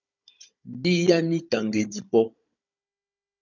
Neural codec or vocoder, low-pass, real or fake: codec, 16 kHz, 16 kbps, FunCodec, trained on Chinese and English, 50 frames a second; 7.2 kHz; fake